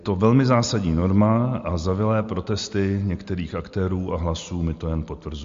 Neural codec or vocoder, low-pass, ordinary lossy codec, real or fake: none; 7.2 kHz; MP3, 48 kbps; real